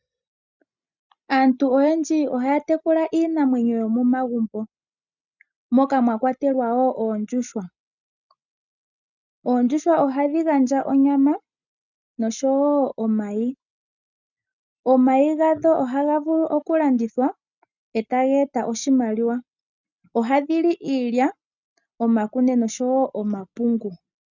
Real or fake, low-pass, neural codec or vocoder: real; 7.2 kHz; none